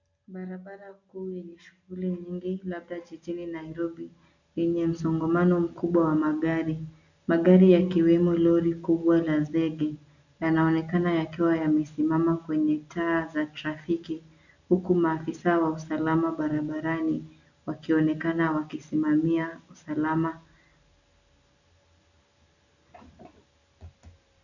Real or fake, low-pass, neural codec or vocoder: real; 7.2 kHz; none